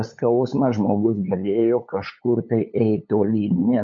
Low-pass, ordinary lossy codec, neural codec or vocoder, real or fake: 7.2 kHz; MP3, 48 kbps; codec, 16 kHz, 8 kbps, FunCodec, trained on LibriTTS, 25 frames a second; fake